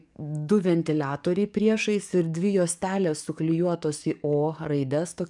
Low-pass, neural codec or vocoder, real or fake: 10.8 kHz; codec, 44.1 kHz, 7.8 kbps, DAC; fake